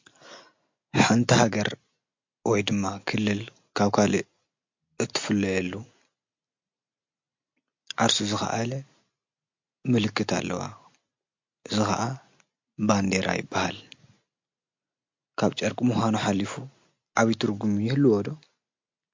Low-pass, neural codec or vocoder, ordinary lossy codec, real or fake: 7.2 kHz; none; MP3, 48 kbps; real